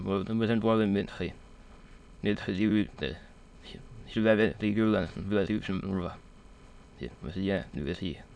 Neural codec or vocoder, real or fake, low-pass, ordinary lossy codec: autoencoder, 22.05 kHz, a latent of 192 numbers a frame, VITS, trained on many speakers; fake; none; none